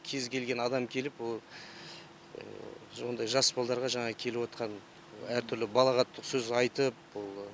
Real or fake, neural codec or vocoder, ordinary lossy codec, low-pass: real; none; none; none